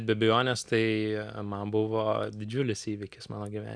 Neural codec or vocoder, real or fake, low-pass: none; real; 9.9 kHz